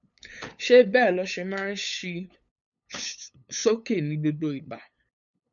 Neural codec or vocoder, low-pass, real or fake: codec, 16 kHz, 8 kbps, FunCodec, trained on LibriTTS, 25 frames a second; 7.2 kHz; fake